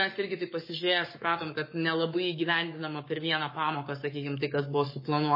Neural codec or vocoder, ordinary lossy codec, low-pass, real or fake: codec, 44.1 kHz, 7.8 kbps, Pupu-Codec; MP3, 24 kbps; 5.4 kHz; fake